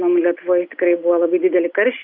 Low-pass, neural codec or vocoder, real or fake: 5.4 kHz; none; real